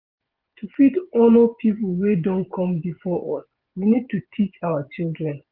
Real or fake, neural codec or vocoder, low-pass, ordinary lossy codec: fake; vocoder, 24 kHz, 100 mel bands, Vocos; 5.4 kHz; Opus, 32 kbps